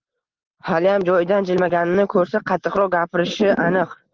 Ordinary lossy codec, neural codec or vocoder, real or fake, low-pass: Opus, 16 kbps; none; real; 7.2 kHz